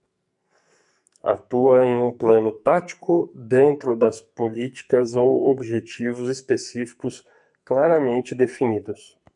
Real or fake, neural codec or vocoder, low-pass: fake; codec, 44.1 kHz, 2.6 kbps, SNAC; 10.8 kHz